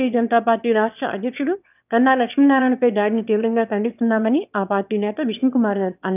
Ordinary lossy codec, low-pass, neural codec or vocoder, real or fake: none; 3.6 kHz; autoencoder, 22.05 kHz, a latent of 192 numbers a frame, VITS, trained on one speaker; fake